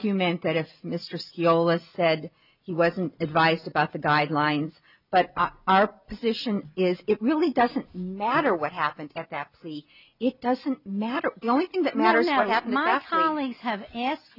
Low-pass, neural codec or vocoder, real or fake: 5.4 kHz; none; real